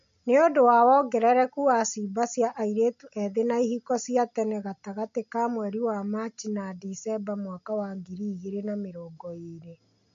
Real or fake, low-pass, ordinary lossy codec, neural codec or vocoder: real; 7.2 kHz; MP3, 48 kbps; none